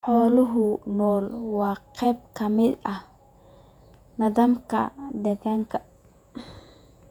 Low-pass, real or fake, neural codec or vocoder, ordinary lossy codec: 19.8 kHz; fake; vocoder, 48 kHz, 128 mel bands, Vocos; none